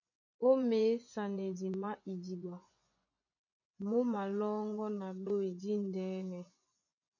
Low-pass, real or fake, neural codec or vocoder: 7.2 kHz; fake; vocoder, 24 kHz, 100 mel bands, Vocos